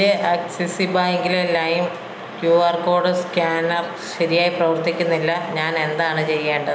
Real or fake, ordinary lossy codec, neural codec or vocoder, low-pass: real; none; none; none